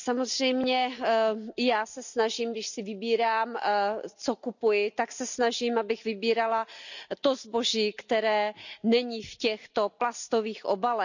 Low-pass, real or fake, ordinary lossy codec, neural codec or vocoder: 7.2 kHz; real; none; none